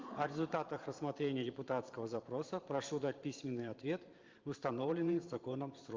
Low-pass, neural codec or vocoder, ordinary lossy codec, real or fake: 7.2 kHz; vocoder, 44.1 kHz, 128 mel bands every 512 samples, BigVGAN v2; Opus, 32 kbps; fake